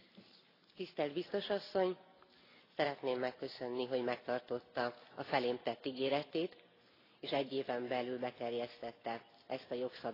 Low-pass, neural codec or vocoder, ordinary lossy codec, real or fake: 5.4 kHz; none; AAC, 24 kbps; real